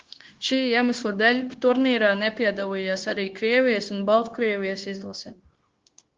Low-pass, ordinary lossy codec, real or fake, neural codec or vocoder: 7.2 kHz; Opus, 16 kbps; fake; codec, 16 kHz, 0.9 kbps, LongCat-Audio-Codec